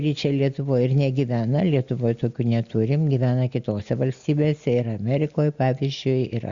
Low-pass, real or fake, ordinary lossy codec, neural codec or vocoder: 7.2 kHz; real; Opus, 64 kbps; none